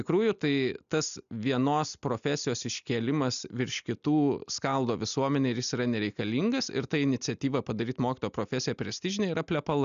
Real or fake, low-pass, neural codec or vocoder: real; 7.2 kHz; none